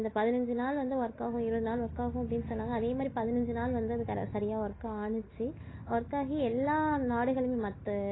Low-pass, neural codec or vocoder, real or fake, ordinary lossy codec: 7.2 kHz; none; real; AAC, 16 kbps